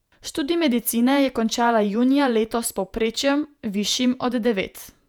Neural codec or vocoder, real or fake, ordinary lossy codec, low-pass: vocoder, 48 kHz, 128 mel bands, Vocos; fake; none; 19.8 kHz